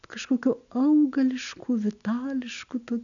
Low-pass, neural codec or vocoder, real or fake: 7.2 kHz; none; real